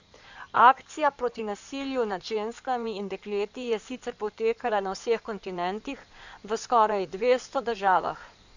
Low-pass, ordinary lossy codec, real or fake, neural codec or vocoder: 7.2 kHz; none; fake; codec, 16 kHz in and 24 kHz out, 2.2 kbps, FireRedTTS-2 codec